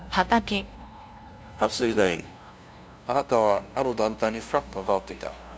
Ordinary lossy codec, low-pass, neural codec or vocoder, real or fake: none; none; codec, 16 kHz, 0.5 kbps, FunCodec, trained on LibriTTS, 25 frames a second; fake